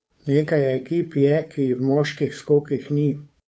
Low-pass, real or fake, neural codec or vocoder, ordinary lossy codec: none; fake; codec, 16 kHz, 2 kbps, FunCodec, trained on Chinese and English, 25 frames a second; none